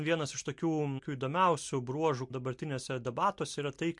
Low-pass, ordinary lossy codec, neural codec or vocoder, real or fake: 10.8 kHz; MP3, 64 kbps; none; real